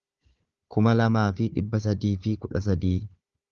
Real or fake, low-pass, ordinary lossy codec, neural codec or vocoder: fake; 7.2 kHz; Opus, 32 kbps; codec, 16 kHz, 4 kbps, FunCodec, trained on Chinese and English, 50 frames a second